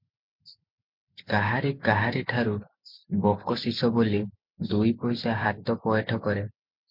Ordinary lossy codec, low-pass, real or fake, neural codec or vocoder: MP3, 48 kbps; 5.4 kHz; real; none